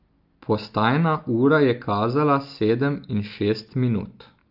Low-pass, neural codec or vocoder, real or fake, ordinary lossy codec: 5.4 kHz; none; real; Opus, 24 kbps